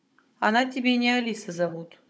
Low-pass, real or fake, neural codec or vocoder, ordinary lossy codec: none; fake; codec, 16 kHz, 16 kbps, FunCodec, trained on Chinese and English, 50 frames a second; none